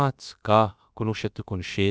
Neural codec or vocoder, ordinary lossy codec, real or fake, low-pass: codec, 16 kHz, about 1 kbps, DyCAST, with the encoder's durations; none; fake; none